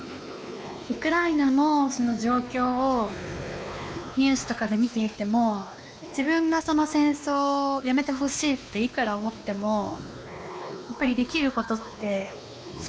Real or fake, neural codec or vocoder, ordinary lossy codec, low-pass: fake; codec, 16 kHz, 2 kbps, X-Codec, WavLM features, trained on Multilingual LibriSpeech; none; none